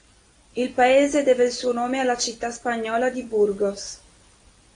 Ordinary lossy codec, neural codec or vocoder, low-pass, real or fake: AAC, 48 kbps; none; 9.9 kHz; real